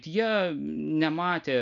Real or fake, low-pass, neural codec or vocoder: real; 7.2 kHz; none